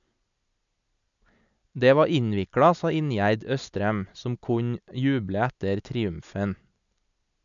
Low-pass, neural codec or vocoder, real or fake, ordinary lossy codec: 7.2 kHz; none; real; none